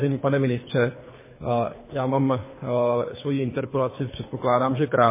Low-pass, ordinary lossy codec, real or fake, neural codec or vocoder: 3.6 kHz; MP3, 16 kbps; fake; codec, 24 kHz, 3 kbps, HILCodec